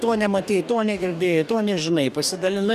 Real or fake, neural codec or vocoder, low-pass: fake; codec, 44.1 kHz, 2.6 kbps, DAC; 14.4 kHz